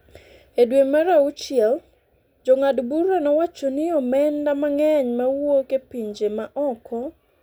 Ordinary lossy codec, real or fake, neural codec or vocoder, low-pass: none; real; none; none